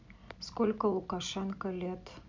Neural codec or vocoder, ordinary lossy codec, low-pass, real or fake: none; MP3, 64 kbps; 7.2 kHz; real